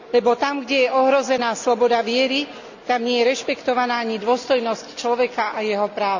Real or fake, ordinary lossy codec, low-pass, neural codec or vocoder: real; none; 7.2 kHz; none